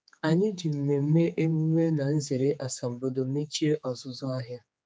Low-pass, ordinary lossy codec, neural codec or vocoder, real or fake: none; none; codec, 16 kHz, 4 kbps, X-Codec, HuBERT features, trained on general audio; fake